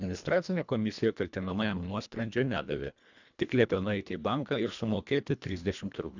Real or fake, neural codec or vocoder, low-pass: fake; codec, 24 kHz, 1.5 kbps, HILCodec; 7.2 kHz